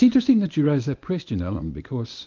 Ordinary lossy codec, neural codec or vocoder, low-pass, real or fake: Opus, 24 kbps; codec, 24 kHz, 0.9 kbps, WavTokenizer, medium speech release version 1; 7.2 kHz; fake